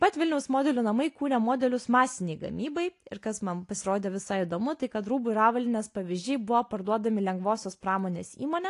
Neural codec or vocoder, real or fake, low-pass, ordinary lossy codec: none; real; 10.8 kHz; AAC, 48 kbps